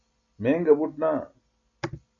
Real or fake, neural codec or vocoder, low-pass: real; none; 7.2 kHz